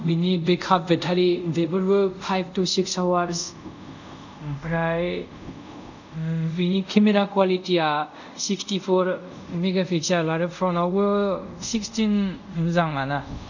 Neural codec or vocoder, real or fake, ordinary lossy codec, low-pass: codec, 24 kHz, 0.5 kbps, DualCodec; fake; none; 7.2 kHz